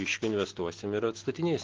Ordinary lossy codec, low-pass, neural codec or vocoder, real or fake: Opus, 16 kbps; 7.2 kHz; none; real